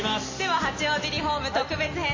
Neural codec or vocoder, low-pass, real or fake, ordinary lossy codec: none; 7.2 kHz; real; MP3, 32 kbps